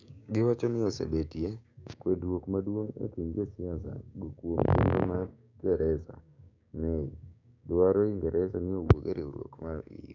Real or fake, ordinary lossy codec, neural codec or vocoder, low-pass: fake; none; codec, 16 kHz, 6 kbps, DAC; 7.2 kHz